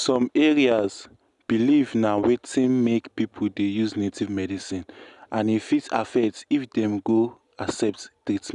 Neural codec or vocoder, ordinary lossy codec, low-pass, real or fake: none; none; 10.8 kHz; real